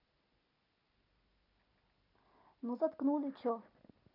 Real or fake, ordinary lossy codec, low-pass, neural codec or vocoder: real; none; 5.4 kHz; none